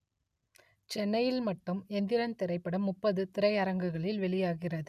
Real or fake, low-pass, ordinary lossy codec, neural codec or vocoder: real; 14.4 kHz; none; none